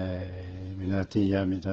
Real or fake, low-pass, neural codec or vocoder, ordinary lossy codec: fake; 7.2 kHz; codec, 16 kHz, 8 kbps, FreqCodec, smaller model; Opus, 16 kbps